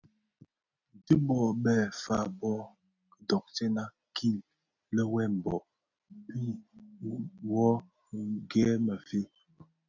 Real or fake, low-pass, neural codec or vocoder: real; 7.2 kHz; none